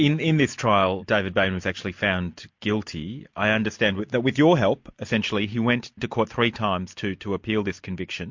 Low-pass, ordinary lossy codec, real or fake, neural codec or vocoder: 7.2 kHz; MP3, 48 kbps; real; none